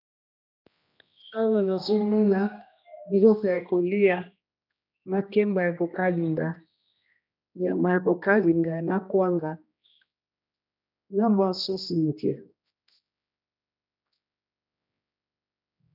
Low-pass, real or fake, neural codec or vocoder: 5.4 kHz; fake; codec, 16 kHz, 1 kbps, X-Codec, HuBERT features, trained on general audio